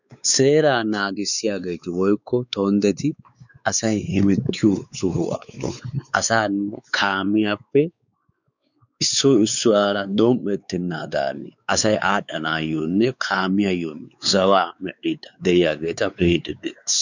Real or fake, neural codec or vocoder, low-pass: fake; codec, 16 kHz, 4 kbps, X-Codec, WavLM features, trained on Multilingual LibriSpeech; 7.2 kHz